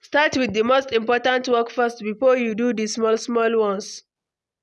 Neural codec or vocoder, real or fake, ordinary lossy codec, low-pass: none; real; none; none